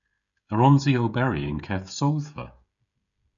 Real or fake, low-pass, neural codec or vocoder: fake; 7.2 kHz; codec, 16 kHz, 16 kbps, FreqCodec, smaller model